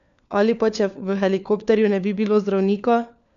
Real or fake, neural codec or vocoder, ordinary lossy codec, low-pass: fake; codec, 16 kHz, 4 kbps, FunCodec, trained on LibriTTS, 50 frames a second; none; 7.2 kHz